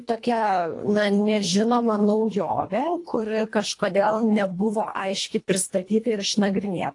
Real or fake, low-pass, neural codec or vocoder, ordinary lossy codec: fake; 10.8 kHz; codec, 24 kHz, 1.5 kbps, HILCodec; AAC, 48 kbps